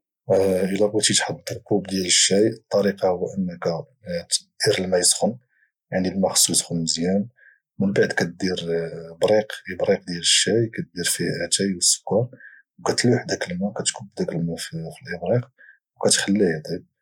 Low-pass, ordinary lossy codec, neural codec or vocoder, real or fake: 19.8 kHz; none; none; real